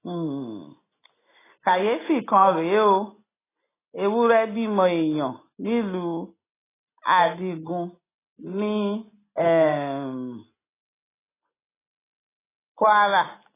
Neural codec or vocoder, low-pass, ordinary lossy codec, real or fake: none; 3.6 kHz; AAC, 16 kbps; real